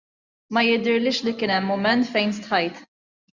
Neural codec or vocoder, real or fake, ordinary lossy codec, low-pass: none; real; Opus, 64 kbps; 7.2 kHz